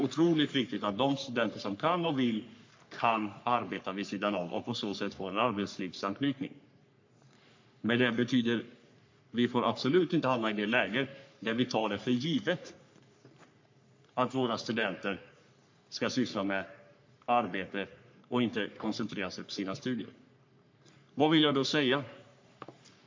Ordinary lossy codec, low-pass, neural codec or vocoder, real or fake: MP3, 48 kbps; 7.2 kHz; codec, 44.1 kHz, 3.4 kbps, Pupu-Codec; fake